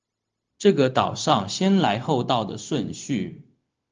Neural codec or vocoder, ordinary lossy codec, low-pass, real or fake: codec, 16 kHz, 0.4 kbps, LongCat-Audio-Codec; Opus, 24 kbps; 7.2 kHz; fake